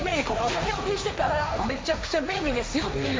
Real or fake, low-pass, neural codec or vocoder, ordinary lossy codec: fake; none; codec, 16 kHz, 1.1 kbps, Voila-Tokenizer; none